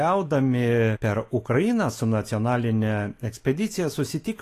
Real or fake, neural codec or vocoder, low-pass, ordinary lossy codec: fake; autoencoder, 48 kHz, 128 numbers a frame, DAC-VAE, trained on Japanese speech; 14.4 kHz; AAC, 48 kbps